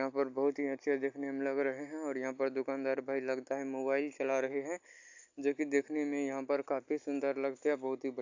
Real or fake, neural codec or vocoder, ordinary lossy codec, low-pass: real; none; AAC, 48 kbps; 7.2 kHz